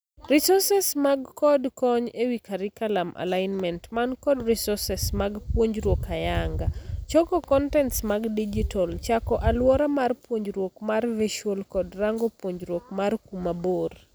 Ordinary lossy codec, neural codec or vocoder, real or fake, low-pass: none; none; real; none